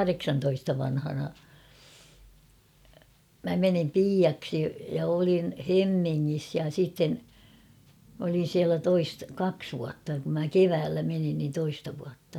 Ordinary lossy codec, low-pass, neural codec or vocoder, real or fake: none; 19.8 kHz; none; real